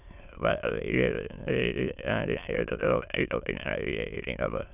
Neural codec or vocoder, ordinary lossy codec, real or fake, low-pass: autoencoder, 22.05 kHz, a latent of 192 numbers a frame, VITS, trained on many speakers; none; fake; 3.6 kHz